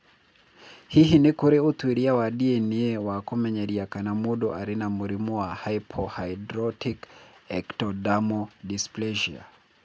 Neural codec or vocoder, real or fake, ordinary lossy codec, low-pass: none; real; none; none